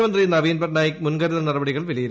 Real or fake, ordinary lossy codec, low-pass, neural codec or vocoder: real; none; none; none